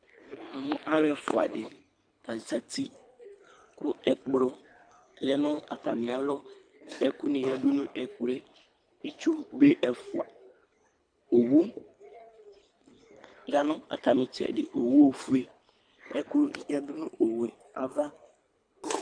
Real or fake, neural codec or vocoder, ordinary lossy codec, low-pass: fake; codec, 24 kHz, 3 kbps, HILCodec; AAC, 64 kbps; 9.9 kHz